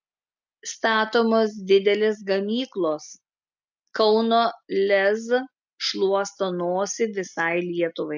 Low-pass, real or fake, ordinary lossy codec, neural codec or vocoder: 7.2 kHz; real; MP3, 64 kbps; none